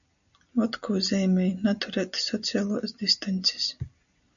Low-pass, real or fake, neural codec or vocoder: 7.2 kHz; real; none